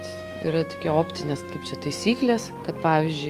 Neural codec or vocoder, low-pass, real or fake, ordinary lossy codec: autoencoder, 48 kHz, 128 numbers a frame, DAC-VAE, trained on Japanese speech; 14.4 kHz; fake; Opus, 32 kbps